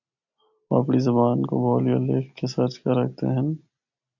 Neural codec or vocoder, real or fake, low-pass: none; real; 7.2 kHz